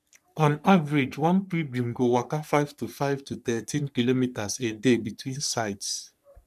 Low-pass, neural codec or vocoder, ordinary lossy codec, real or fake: 14.4 kHz; codec, 44.1 kHz, 3.4 kbps, Pupu-Codec; none; fake